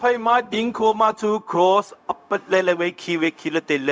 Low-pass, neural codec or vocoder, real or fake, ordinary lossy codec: none; codec, 16 kHz, 0.4 kbps, LongCat-Audio-Codec; fake; none